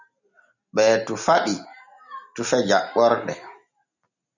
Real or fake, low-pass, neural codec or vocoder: real; 7.2 kHz; none